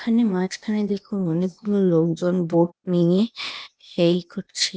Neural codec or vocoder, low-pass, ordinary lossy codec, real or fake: codec, 16 kHz, 0.8 kbps, ZipCodec; none; none; fake